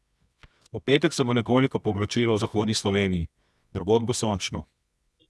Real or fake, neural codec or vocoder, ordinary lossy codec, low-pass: fake; codec, 24 kHz, 0.9 kbps, WavTokenizer, medium music audio release; none; none